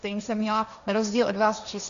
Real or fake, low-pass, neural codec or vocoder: fake; 7.2 kHz; codec, 16 kHz, 1.1 kbps, Voila-Tokenizer